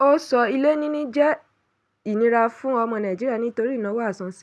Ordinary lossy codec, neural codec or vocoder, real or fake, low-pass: none; none; real; none